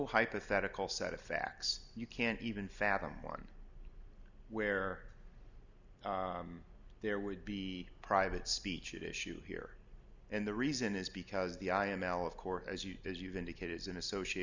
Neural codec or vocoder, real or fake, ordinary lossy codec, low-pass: none; real; Opus, 64 kbps; 7.2 kHz